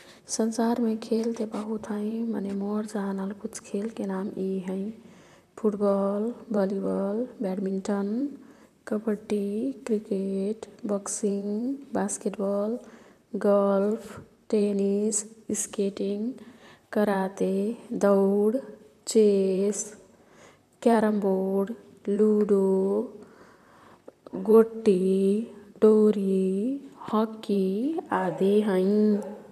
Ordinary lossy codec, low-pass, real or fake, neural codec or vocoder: none; 14.4 kHz; fake; vocoder, 44.1 kHz, 128 mel bands, Pupu-Vocoder